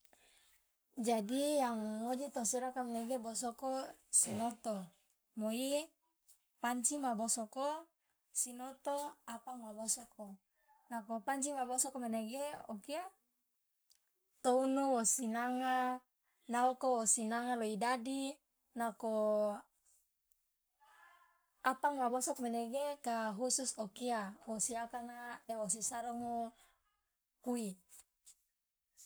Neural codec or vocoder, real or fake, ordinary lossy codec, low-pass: codec, 44.1 kHz, 7.8 kbps, Pupu-Codec; fake; none; none